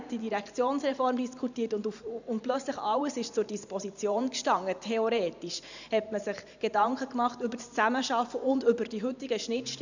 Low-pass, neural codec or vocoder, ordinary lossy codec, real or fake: 7.2 kHz; vocoder, 44.1 kHz, 128 mel bands every 512 samples, BigVGAN v2; none; fake